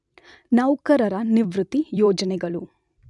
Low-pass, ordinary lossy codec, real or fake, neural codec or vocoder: 10.8 kHz; none; fake; vocoder, 44.1 kHz, 128 mel bands every 256 samples, BigVGAN v2